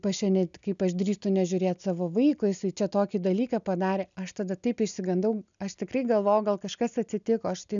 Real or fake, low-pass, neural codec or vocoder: real; 7.2 kHz; none